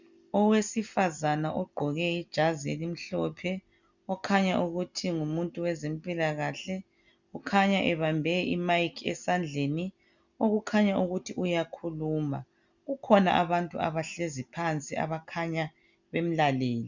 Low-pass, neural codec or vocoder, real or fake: 7.2 kHz; none; real